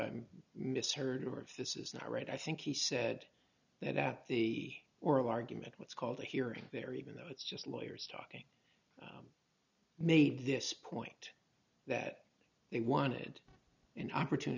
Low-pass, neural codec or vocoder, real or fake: 7.2 kHz; none; real